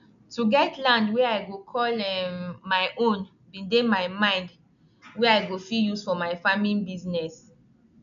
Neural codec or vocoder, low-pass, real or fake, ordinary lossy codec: none; 7.2 kHz; real; none